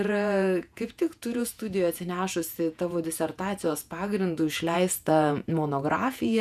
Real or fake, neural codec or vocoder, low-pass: fake; vocoder, 48 kHz, 128 mel bands, Vocos; 14.4 kHz